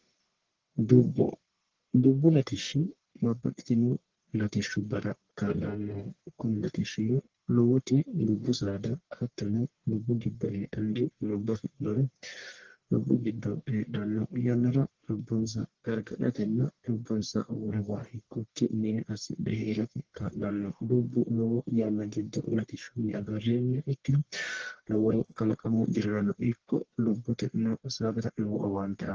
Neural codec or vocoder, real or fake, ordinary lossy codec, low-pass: codec, 44.1 kHz, 1.7 kbps, Pupu-Codec; fake; Opus, 16 kbps; 7.2 kHz